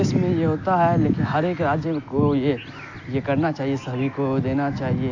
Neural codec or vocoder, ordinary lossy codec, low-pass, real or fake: none; AAC, 48 kbps; 7.2 kHz; real